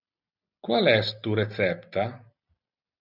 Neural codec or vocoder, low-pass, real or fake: none; 5.4 kHz; real